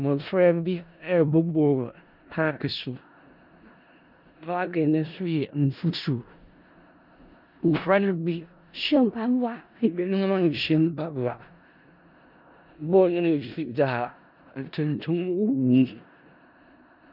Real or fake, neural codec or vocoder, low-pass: fake; codec, 16 kHz in and 24 kHz out, 0.4 kbps, LongCat-Audio-Codec, four codebook decoder; 5.4 kHz